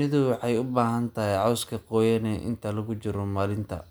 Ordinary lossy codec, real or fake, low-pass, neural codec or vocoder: none; real; none; none